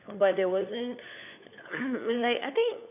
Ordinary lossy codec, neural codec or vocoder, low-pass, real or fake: none; codec, 16 kHz, 4 kbps, FunCodec, trained on LibriTTS, 50 frames a second; 3.6 kHz; fake